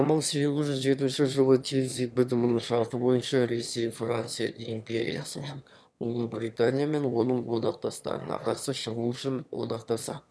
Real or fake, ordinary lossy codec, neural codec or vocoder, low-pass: fake; none; autoencoder, 22.05 kHz, a latent of 192 numbers a frame, VITS, trained on one speaker; none